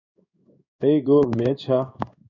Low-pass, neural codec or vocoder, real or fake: 7.2 kHz; codec, 16 kHz in and 24 kHz out, 1 kbps, XY-Tokenizer; fake